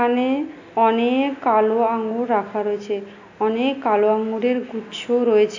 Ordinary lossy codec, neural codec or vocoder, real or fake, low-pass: AAC, 48 kbps; none; real; 7.2 kHz